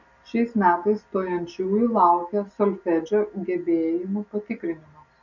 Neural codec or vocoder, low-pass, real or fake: none; 7.2 kHz; real